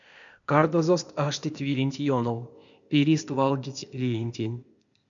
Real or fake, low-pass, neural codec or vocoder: fake; 7.2 kHz; codec, 16 kHz, 0.8 kbps, ZipCodec